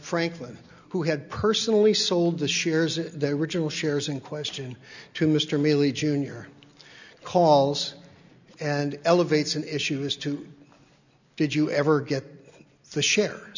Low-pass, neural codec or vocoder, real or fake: 7.2 kHz; none; real